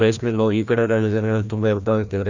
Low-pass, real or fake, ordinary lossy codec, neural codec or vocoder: 7.2 kHz; fake; none; codec, 16 kHz, 1 kbps, FreqCodec, larger model